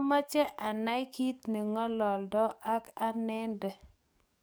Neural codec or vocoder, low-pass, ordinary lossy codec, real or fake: codec, 44.1 kHz, 7.8 kbps, DAC; none; none; fake